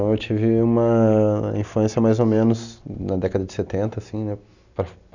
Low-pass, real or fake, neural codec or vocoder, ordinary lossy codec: 7.2 kHz; real; none; none